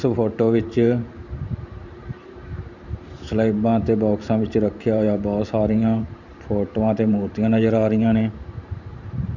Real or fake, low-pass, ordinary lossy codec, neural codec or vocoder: fake; 7.2 kHz; none; vocoder, 44.1 kHz, 128 mel bands every 512 samples, BigVGAN v2